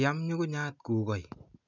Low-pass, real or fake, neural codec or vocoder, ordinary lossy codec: 7.2 kHz; real; none; none